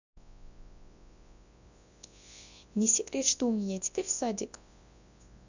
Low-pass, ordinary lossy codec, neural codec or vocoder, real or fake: 7.2 kHz; none; codec, 24 kHz, 0.9 kbps, WavTokenizer, large speech release; fake